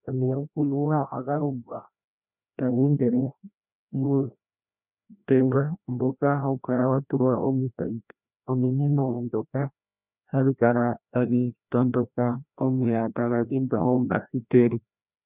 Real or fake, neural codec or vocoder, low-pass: fake; codec, 16 kHz, 1 kbps, FreqCodec, larger model; 3.6 kHz